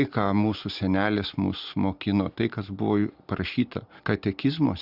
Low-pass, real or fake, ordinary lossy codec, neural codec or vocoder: 5.4 kHz; real; Opus, 64 kbps; none